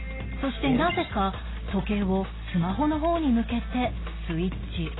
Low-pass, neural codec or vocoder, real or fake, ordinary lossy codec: 7.2 kHz; none; real; AAC, 16 kbps